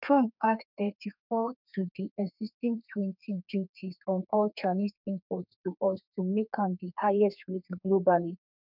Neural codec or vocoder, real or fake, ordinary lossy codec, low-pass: codec, 32 kHz, 1.9 kbps, SNAC; fake; none; 5.4 kHz